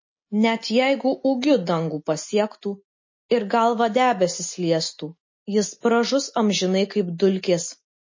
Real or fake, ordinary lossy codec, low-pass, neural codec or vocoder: real; MP3, 32 kbps; 7.2 kHz; none